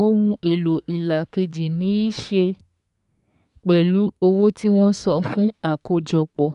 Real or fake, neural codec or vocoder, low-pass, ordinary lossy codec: fake; codec, 24 kHz, 1 kbps, SNAC; 10.8 kHz; none